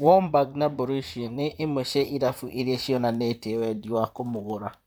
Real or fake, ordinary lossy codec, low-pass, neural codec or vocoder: fake; none; none; vocoder, 44.1 kHz, 128 mel bands, Pupu-Vocoder